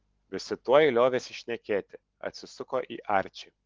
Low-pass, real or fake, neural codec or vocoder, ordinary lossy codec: 7.2 kHz; real; none; Opus, 16 kbps